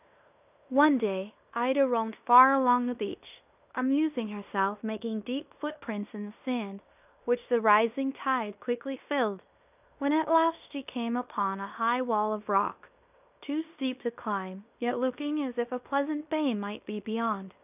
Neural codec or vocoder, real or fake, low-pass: codec, 16 kHz in and 24 kHz out, 0.9 kbps, LongCat-Audio-Codec, fine tuned four codebook decoder; fake; 3.6 kHz